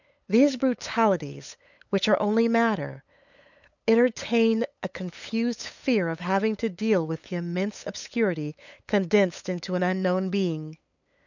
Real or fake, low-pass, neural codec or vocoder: fake; 7.2 kHz; codec, 16 kHz, 8 kbps, FunCodec, trained on LibriTTS, 25 frames a second